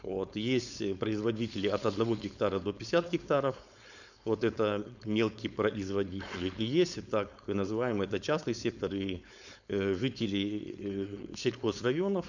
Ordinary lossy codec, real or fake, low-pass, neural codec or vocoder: none; fake; 7.2 kHz; codec, 16 kHz, 4.8 kbps, FACodec